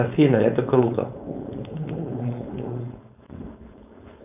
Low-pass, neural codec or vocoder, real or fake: 3.6 kHz; codec, 16 kHz, 4.8 kbps, FACodec; fake